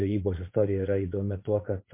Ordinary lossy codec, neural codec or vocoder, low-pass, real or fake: MP3, 24 kbps; vocoder, 22.05 kHz, 80 mel bands, Vocos; 3.6 kHz; fake